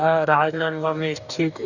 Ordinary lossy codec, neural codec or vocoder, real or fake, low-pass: none; codec, 44.1 kHz, 2.6 kbps, DAC; fake; 7.2 kHz